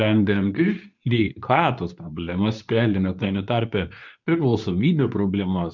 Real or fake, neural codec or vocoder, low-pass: fake; codec, 24 kHz, 0.9 kbps, WavTokenizer, medium speech release version 2; 7.2 kHz